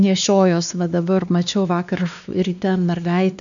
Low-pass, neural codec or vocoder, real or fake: 7.2 kHz; codec, 16 kHz, 2 kbps, X-Codec, WavLM features, trained on Multilingual LibriSpeech; fake